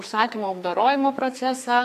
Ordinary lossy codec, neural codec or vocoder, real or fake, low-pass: AAC, 64 kbps; codec, 44.1 kHz, 2.6 kbps, SNAC; fake; 14.4 kHz